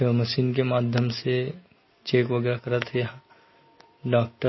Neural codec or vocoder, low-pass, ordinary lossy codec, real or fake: none; 7.2 kHz; MP3, 24 kbps; real